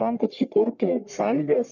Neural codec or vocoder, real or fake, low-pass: codec, 44.1 kHz, 1.7 kbps, Pupu-Codec; fake; 7.2 kHz